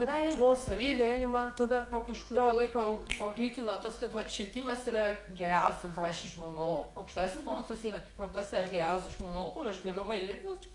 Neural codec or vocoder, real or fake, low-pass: codec, 24 kHz, 0.9 kbps, WavTokenizer, medium music audio release; fake; 10.8 kHz